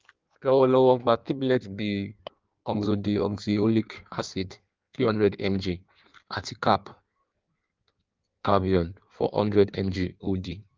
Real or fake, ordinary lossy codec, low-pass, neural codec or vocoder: fake; Opus, 32 kbps; 7.2 kHz; codec, 16 kHz in and 24 kHz out, 1.1 kbps, FireRedTTS-2 codec